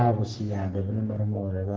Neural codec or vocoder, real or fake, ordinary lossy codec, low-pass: codec, 44.1 kHz, 3.4 kbps, Pupu-Codec; fake; Opus, 16 kbps; 7.2 kHz